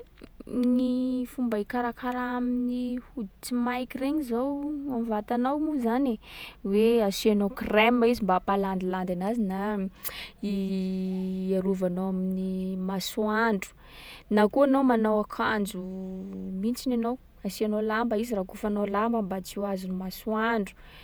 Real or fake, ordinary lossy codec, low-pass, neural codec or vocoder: fake; none; none; vocoder, 48 kHz, 128 mel bands, Vocos